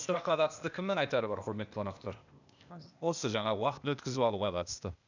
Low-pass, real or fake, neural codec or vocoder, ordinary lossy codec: 7.2 kHz; fake; codec, 16 kHz, 0.8 kbps, ZipCodec; none